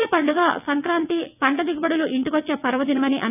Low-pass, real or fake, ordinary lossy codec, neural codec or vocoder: 3.6 kHz; fake; none; vocoder, 22.05 kHz, 80 mel bands, WaveNeXt